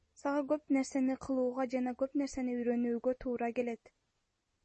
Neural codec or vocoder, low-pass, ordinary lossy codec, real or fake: none; 10.8 kHz; MP3, 32 kbps; real